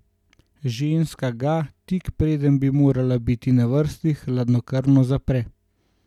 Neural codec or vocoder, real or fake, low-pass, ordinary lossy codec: none; real; 19.8 kHz; none